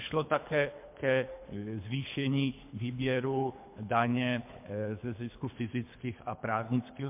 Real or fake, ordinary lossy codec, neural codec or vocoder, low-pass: fake; MP3, 32 kbps; codec, 24 kHz, 3 kbps, HILCodec; 3.6 kHz